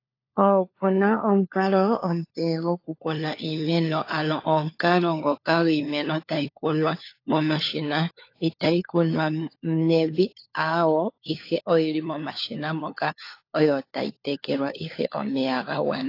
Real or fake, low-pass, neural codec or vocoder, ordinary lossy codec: fake; 5.4 kHz; codec, 16 kHz, 4 kbps, FunCodec, trained on LibriTTS, 50 frames a second; AAC, 32 kbps